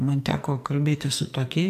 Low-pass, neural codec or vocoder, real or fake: 14.4 kHz; codec, 44.1 kHz, 2.6 kbps, SNAC; fake